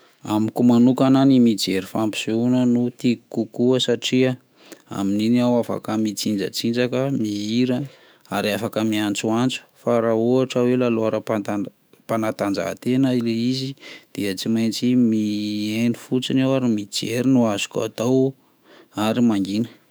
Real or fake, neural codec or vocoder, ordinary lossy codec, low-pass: fake; autoencoder, 48 kHz, 128 numbers a frame, DAC-VAE, trained on Japanese speech; none; none